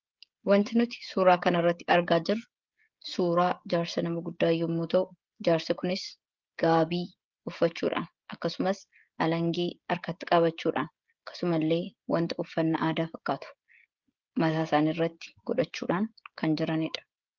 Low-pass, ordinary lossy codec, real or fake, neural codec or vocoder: 7.2 kHz; Opus, 32 kbps; fake; codec, 16 kHz, 16 kbps, FreqCodec, smaller model